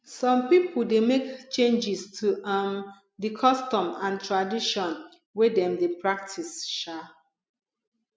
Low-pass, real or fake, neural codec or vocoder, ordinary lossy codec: none; real; none; none